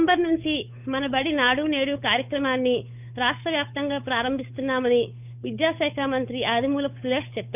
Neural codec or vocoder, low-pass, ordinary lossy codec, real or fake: codec, 16 kHz, 8 kbps, FunCodec, trained on Chinese and English, 25 frames a second; 3.6 kHz; none; fake